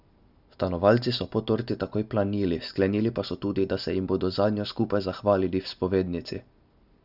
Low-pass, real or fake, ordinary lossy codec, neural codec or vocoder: 5.4 kHz; real; none; none